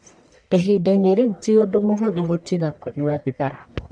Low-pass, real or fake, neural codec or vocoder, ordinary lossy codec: 9.9 kHz; fake; codec, 44.1 kHz, 1.7 kbps, Pupu-Codec; none